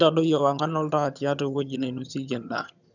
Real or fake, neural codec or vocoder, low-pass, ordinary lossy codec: fake; vocoder, 22.05 kHz, 80 mel bands, HiFi-GAN; 7.2 kHz; none